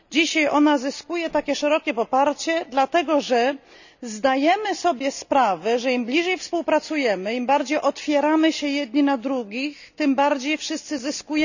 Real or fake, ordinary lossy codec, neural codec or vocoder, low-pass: real; none; none; 7.2 kHz